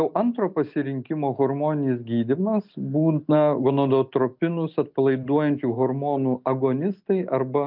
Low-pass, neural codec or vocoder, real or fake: 5.4 kHz; none; real